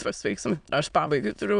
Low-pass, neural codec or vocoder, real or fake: 9.9 kHz; autoencoder, 22.05 kHz, a latent of 192 numbers a frame, VITS, trained on many speakers; fake